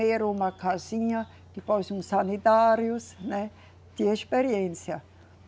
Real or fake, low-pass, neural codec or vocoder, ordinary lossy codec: real; none; none; none